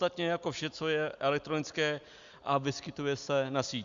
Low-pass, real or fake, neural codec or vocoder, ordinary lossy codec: 7.2 kHz; real; none; Opus, 64 kbps